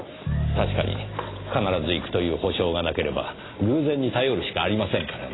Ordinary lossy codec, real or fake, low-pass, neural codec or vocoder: AAC, 16 kbps; real; 7.2 kHz; none